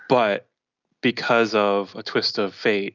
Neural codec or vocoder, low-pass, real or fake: none; 7.2 kHz; real